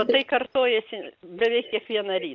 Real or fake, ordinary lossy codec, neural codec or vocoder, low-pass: real; Opus, 24 kbps; none; 7.2 kHz